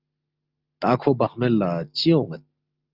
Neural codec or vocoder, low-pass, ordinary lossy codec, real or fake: none; 5.4 kHz; Opus, 24 kbps; real